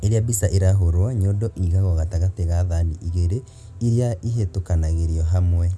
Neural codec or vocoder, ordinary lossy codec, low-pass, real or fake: none; none; none; real